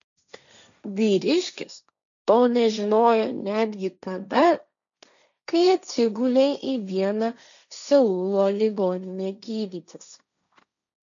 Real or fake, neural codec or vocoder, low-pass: fake; codec, 16 kHz, 1.1 kbps, Voila-Tokenizer; 7.2 kHz